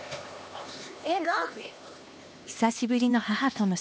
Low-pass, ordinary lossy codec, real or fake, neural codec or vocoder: none; none; fake; codec, 16 kHz, 2 kbps, X-Codec, HuBERT features, trained on LibriSpeech